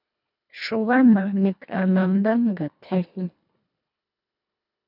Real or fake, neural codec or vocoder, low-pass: fake; codec, 24 kHz, 1.5 kbps, HILCodec; 5.4 kHz